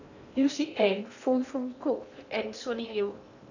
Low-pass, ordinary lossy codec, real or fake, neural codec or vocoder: 7.2 kHz; none; fake; codec, 16 kHz in and 24 kHz out, 0.8 kbps, FocalCodec, streaming, 65536 codes